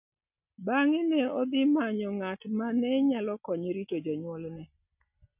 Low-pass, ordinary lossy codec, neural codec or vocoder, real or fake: 3.6 kHz; none; none; real